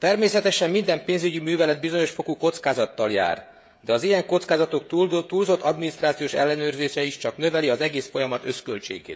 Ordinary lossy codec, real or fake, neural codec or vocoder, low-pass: none; fake; codec, 16 kHz, 16 kbps, FreqCodec, smaller model; none